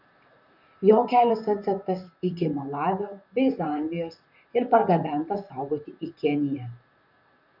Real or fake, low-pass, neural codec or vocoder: fake; 5.4 kHz; vocoder, 44.1 kHz, 128 mel bands, Pupu-Vocoder